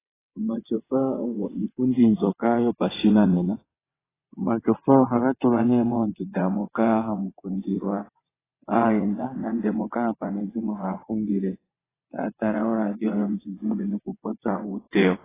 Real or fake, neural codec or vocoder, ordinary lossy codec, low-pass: fake; vocoder, 22.05 kHz, 80 mel bands, WaveNeXt; AAC, 16 kbps; 3.6 kHz